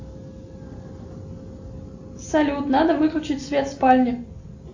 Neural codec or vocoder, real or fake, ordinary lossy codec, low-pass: none; real; AAC, 48 kbps; 7.2 kHz